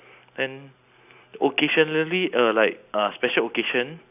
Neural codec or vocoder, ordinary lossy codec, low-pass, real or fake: none; none; 3.6 kHz; real